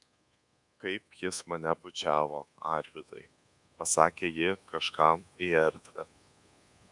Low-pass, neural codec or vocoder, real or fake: 10.8 kHz; codec, 24 kHz, 1.2 kbps, DualCodec; fake